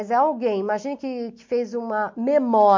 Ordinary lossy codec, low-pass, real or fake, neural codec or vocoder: MP3, 48 kbps; 7.2 kHz; real; none